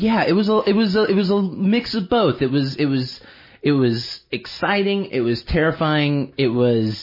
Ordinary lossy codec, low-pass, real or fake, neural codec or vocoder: MP3, 24 kbps; 5.4 kHz; real; none